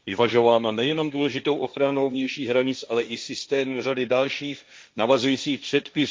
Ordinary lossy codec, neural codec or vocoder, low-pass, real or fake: none; codec, 16 kHz, 1.1 kbps, Voila-Tokenizer; none; fake